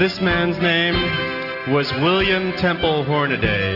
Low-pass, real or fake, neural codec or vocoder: 5.4 kHz; real; none